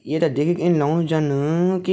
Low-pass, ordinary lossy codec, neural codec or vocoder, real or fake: none; none; none; real